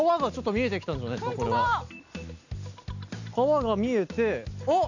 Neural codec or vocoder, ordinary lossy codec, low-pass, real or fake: none; none; 7.2 kHz; real